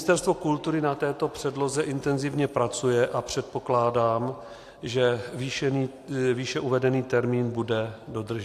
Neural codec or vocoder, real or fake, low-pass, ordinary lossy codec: none; real; 14.4 kHz; AAC, 64 kbps